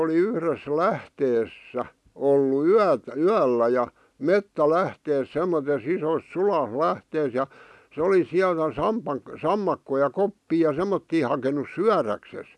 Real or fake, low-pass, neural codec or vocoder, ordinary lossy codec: real; none; none; none